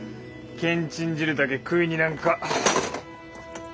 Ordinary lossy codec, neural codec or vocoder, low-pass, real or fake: none; none; none; real